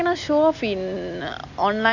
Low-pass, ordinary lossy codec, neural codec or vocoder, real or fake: 7.2 kHz; none; none; real